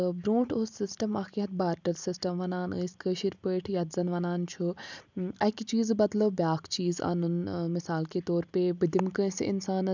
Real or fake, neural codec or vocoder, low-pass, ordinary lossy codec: real; none; 7.2 kHz; none